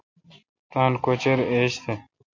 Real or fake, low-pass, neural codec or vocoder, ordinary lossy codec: real; 7.2 kHz; none; MP3, 48 kbps